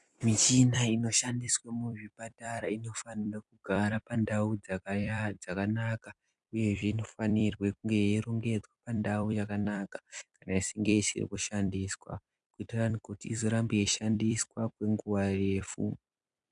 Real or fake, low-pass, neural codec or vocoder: fake; 10.8 kHz; vocoder, 44.1 kHz, 128 mel bands every 256 samples, BigVGAN v2